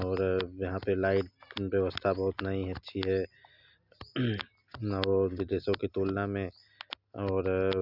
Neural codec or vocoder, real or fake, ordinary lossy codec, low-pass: none; real; none; 5.4 kHz